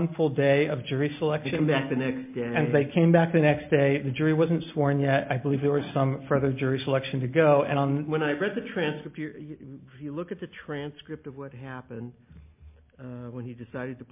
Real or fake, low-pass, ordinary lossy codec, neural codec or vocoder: real; 3.6 kHz; MP3, 32 kbps; none